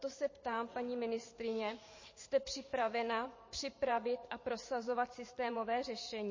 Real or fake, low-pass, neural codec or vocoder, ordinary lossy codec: fake; 7.2 kHz; vocoder, 44.1 kHz, 128 mel bands every 512 samples, BigVGAN v2; MP3, 32 kbps